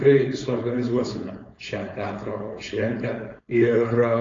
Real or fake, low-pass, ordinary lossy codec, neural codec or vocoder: fake; 7.2 kHz; AAC, 32 kbps; codec, 16 kHz, 4.8 kbps, FACodec